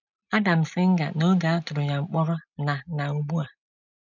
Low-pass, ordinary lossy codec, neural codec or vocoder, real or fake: 7.2 kHz; none; none; real